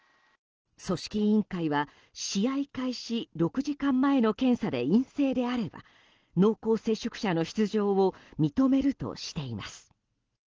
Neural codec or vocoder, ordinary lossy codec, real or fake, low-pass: none; Opus, 16 kbps; real; 7.2 kHz